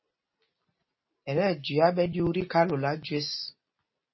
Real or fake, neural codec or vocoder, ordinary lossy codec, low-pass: real; none; MP3, 24 kbps; 7.2 kHz